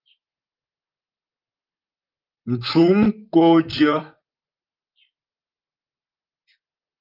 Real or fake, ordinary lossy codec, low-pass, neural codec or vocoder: fake; Opus, 24 kbps; 5.4 kHz; vocoder, 44.1 kHz, 128 mel bands, Pupu-Vocoder